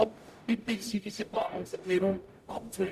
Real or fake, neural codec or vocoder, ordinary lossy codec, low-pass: fake; codec, 44.1 kHz, 0.9 kbps, DAC; Opus, 64 kbps; 14.4 kHz